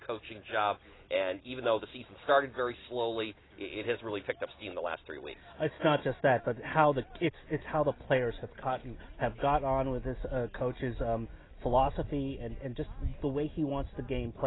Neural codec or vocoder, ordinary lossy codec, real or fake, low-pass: none; AAC, 16 kbps; real; 7.2 kHz